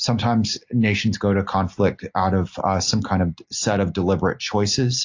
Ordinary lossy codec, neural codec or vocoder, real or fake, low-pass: AAC, 48 kbps; none; real; 7.2 kHz